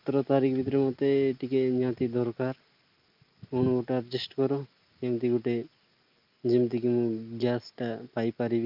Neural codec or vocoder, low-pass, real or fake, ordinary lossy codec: none; 5.4 kHz; real; Opus, 24 kbps